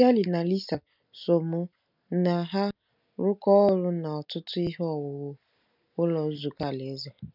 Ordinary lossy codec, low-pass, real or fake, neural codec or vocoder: none; 5.4 kHz; real; none